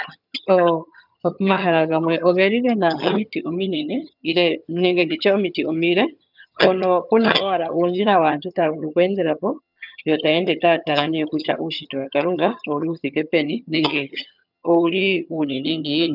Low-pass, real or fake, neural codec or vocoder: 5.4 kHz; fake; vocoder, 22.05 kHz, 80 mel bands, HiFi-GAN